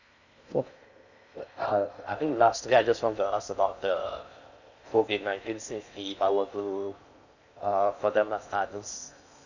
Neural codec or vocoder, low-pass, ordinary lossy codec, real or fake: codec, 16 kHz in and 24 kHz out, 0.6 kbps, FocalCodec, streaming, 4096 codes; 7.2 kHz; none; fake